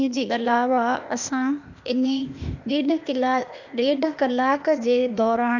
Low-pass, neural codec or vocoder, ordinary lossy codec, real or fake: 7.2 kHz; codec, 16 kHz, 0.8 kbps, ZipCodec; none; fake